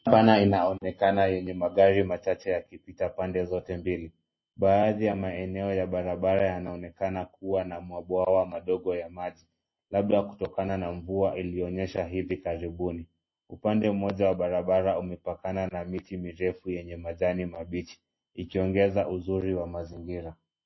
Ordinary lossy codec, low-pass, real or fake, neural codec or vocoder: MP3, 24 kbps; 7.2 kHz; real; none